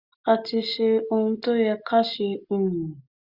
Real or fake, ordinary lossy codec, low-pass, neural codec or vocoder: real; Opus, 64 kbps; 5.4 kHz; none